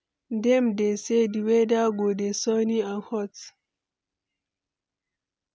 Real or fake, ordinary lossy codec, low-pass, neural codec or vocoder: real; none; none; none